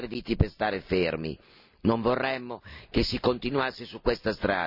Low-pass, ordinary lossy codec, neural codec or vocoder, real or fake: 5.4 kHz; none; none; real